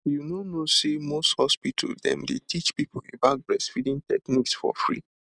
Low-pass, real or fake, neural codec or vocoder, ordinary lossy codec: 9.9 kHz; real; none; none